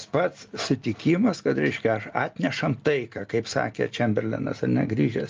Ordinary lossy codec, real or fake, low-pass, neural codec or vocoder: Opus, 24 kbps; real; 7.2 kHz; none